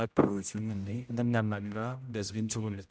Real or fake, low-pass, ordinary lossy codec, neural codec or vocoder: fake; none; none; codec, 16 kHz, 0.5 kbps, X-Codec, HuBERT features, trained on general audio